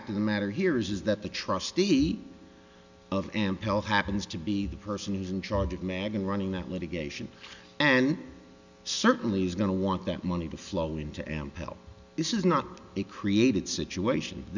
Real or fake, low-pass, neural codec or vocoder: real; 7.2 kHz; none